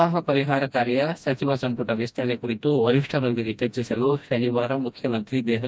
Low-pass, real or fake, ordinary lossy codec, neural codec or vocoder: none; fake; none; codec, 16 kHz, 1 kbps, FreqCodec, smaller model